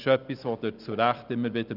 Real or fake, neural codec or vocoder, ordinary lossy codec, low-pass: real; none; none; 5.4 kHz